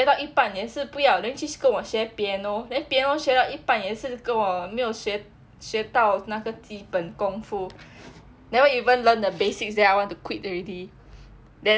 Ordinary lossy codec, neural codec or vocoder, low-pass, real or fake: none; none; none; real